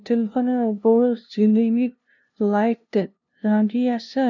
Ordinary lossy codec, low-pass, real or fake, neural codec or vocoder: none; 7.2 kHz; fake; codec, 16 kHz, 0.5 kbps, FunCodec, trained on LibriTTS, 25 frames a second